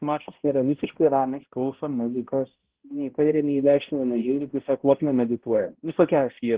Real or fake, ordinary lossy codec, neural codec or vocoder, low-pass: fake; Opus, 16 kbps; codec, 16 kHz, 0.5 kbps, X-Codec, HuBERT features, trained on balanced general audio; 3.6 kHz